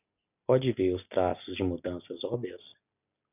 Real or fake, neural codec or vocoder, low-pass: real; none; 3.6 kHz